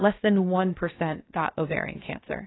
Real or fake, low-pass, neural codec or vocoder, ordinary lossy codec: fake; 7.2 kHz; codec, 16 kHz, 0.7 kbps, FocalCodec; AAC, 16 kbps